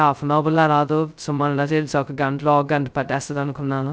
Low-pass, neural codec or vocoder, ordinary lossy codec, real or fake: none; codec, 16 kHz, 0.2 kbps, FocalCodec; none; fake